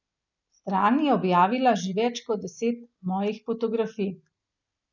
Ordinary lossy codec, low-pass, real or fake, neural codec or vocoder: none; 7.2 kHz; real; none